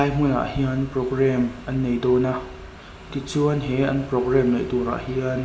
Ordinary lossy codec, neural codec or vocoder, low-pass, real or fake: none; none; none; real